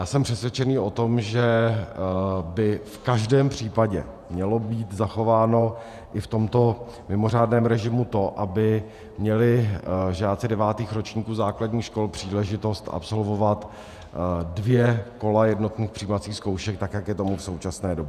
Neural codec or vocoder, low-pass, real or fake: vocoder, 48 kHz, 128 mel bands, Vocos; 14.4 kHz; fake